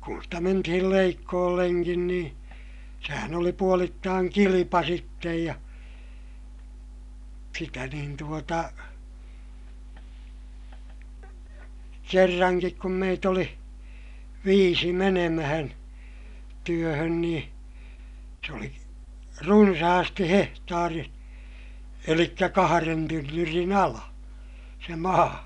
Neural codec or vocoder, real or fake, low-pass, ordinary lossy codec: none; real; 10.8 kHz; none